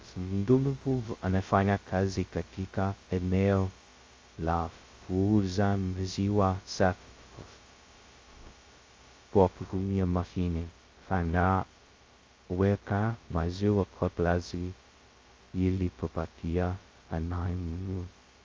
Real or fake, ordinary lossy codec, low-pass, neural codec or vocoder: fake; Opus, 32 kbps; 7.2 kHz; codec, 16 kHz, 0.2 kbps, FocalCodec